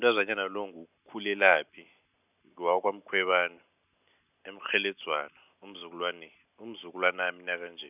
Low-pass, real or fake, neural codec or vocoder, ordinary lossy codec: 3.6 kHz; real; none; none